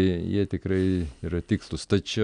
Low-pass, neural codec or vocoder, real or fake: 9.9 kHz; none; real